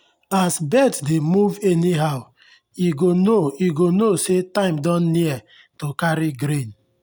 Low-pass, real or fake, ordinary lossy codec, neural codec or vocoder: none; real; none; none